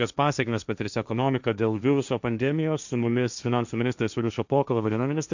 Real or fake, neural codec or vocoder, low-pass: fake; codec, 16 kHz, 1.1 kbps, Voila-Tokenizer; 7.2 kHz